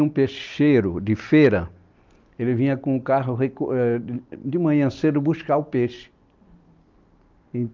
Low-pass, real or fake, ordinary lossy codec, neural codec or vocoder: 7.2 kHz; real; Opus, 24 kbps; none